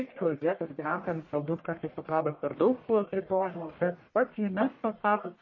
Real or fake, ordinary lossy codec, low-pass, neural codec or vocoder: fake; MP3, 32 kbps; 7.2 kHz; codec, 44.1 kHz, 1.7 kbps, Pupu-Codec